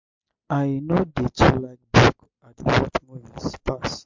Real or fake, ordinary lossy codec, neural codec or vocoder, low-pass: real; MP3, 64 kbps; none; 7.2 kHz